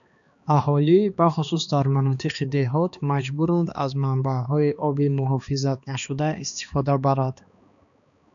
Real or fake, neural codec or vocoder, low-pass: fake; codec, 16 kHz, 2 kbps, X-Codec, HuBERT features, trained on balanced general audio; 7.2 kHz